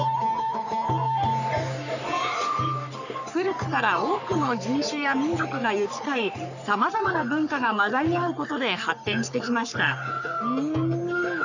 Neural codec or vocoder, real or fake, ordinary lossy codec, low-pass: codec, 44.1 kHz, 3.4 kbps, Pupu-Codec; fake; none; 7.2 kHz